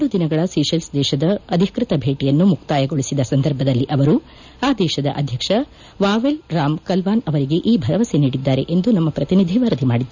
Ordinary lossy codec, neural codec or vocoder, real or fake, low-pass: none; none; real; 7.2 kHz